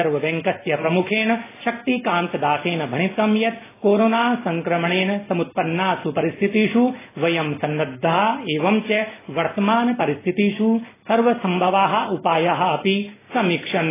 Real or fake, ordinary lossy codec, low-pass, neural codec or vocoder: real; AAC, 16 kbps; 3.6 kHz; none